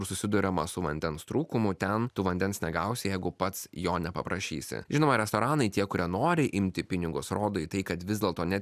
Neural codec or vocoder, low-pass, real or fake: none; 14.4 kHz; real